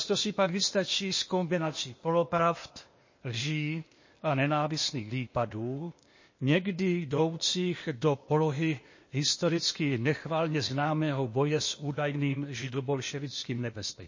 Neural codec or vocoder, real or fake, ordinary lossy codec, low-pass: codec, 16 kHz, 0.8 kbps, ZipCodec; fake; MP3, 32 kbps; 7.2 kHz